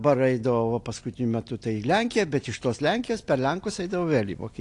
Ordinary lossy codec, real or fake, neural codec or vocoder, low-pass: AAC, 48 kbps; real; none; 10.8 kHz